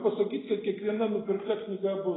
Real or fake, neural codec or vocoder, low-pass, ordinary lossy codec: real; none; 7.2 kHz; AAC, 16 kbps